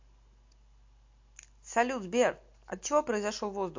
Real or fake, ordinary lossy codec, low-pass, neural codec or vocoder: real; MP3, 48 kbps; 7.2 kHz; none